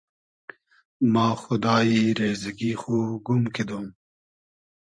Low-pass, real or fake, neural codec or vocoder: 9.9 kHz; fake; vocoder, 44.1 kHz, 128 mel bands every 512 samples, BigVGAN v2